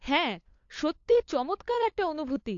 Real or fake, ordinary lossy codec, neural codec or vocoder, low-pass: fake; AAC, 48 kbps; codec, 16 kHz, 8 kbps, FunCodec, trained on LibriTTS, 25 frames a second; 7.2 kHz